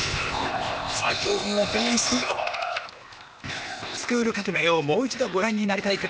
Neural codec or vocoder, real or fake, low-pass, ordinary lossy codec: codec, 16 kHz, 0.8 kbps, ZipCodec; fake; none; none